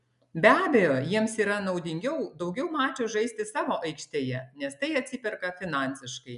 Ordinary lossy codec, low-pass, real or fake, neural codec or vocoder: MP3, 96 kbps; 10.8 kHz; real; none